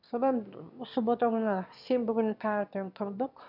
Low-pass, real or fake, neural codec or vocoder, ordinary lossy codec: 5.4 kHz; fake; autoencoder, 22.05 kHz, a latent of 192 numbers a frame, VITS, trained on one speaker; none